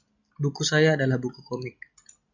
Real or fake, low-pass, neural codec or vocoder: real; 7.2 kHz; none